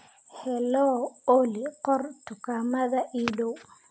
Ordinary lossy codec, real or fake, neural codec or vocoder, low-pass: none; real; none; none